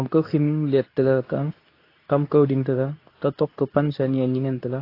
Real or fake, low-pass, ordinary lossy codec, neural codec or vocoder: fake; 5.4 kHz; AAC, 32 kbps; codec, 24 kHz, 0.9 kbps, WavTokenizer, medium speech release version 2